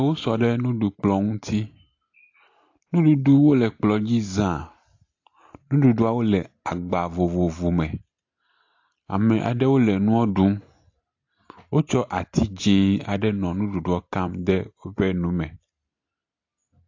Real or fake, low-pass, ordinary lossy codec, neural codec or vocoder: real; 7.2 kHz; AAC, 48 kbps; none